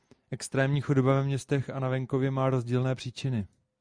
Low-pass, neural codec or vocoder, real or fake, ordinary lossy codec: 9.9 kHz; none; real; Opus, 64 kbps